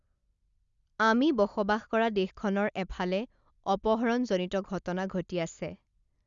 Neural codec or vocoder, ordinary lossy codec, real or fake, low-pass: none; Opus, 64 kbps; real; 7.2 kHz